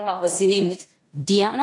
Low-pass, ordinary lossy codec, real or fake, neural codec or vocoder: 10.8 kHz; AAC, 48 kbps; fake; codec, 16 kHz in and 24 kHz out, 0.9 kbps, LongCat-Audio-Codec, four codebook decoder